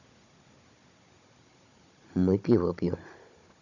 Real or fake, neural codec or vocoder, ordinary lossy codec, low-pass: fake; codec, 16 kHz, 16 kbps, FunCodec, trained on Chinese and English, 50 frames a second; none; 7.2 kHz